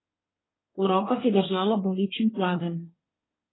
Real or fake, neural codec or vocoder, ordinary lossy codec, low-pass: fake; codec, 24 kHz, 1 kbps, SNAC; AAC, 16 kbps; 7.2 kHz